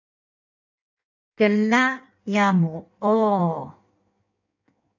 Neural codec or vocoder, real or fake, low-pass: codec, 16 kHz in and 24 kHz out, 1.1 kbps, FireRedTTS-2 codec; fake; 7.2 kHz